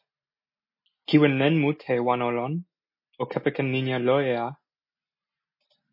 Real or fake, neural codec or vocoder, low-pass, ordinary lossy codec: real; none; 5.4 kHz; MP3, 24 kbps